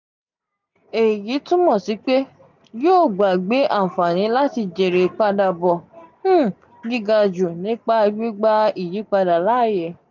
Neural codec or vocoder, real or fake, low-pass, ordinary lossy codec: none; real; 7.2 kHz; none